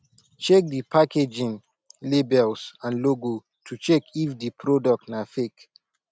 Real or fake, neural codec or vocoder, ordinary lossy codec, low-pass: real; none; none; none